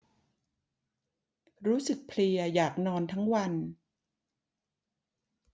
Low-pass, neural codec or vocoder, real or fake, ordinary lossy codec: none; none; real; none